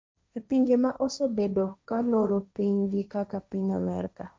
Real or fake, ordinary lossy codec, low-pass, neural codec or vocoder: fake; none; none; codec, 16 kHz, 1.1 kbps, Voila-Tokenizer